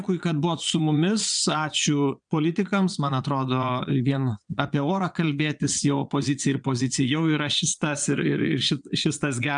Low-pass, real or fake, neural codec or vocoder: 9.9 kHz; fake; vocoder, 22.05 kHz, 80 mel bands, Vocos